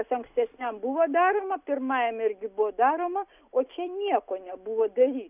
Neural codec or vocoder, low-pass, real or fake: none; 3.6 kHz; real